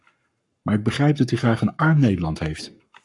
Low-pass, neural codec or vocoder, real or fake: 10.8 kHz; codec, 44.1 kHz, 7.8 kbps, Pupu-Codec; fake